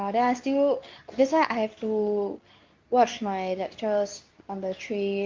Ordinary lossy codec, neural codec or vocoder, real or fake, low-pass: Opus, 24 kbps; codec, 24 kHz, 0.9 kbps, WavTokenizer, medium speech release version 2; fake; 7.2 kHz